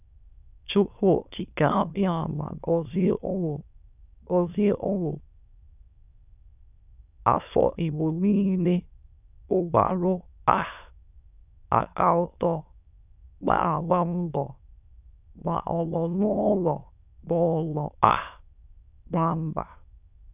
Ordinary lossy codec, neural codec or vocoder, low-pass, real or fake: none; autoencoder, 22.05 kHz, a latent of 192 numbers a frame, VITS, trained on many speakers; 3.6 kHz; fake